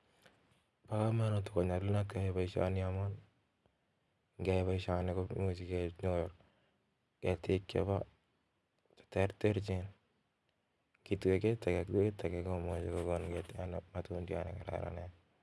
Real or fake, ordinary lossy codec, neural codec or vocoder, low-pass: real; none; none; none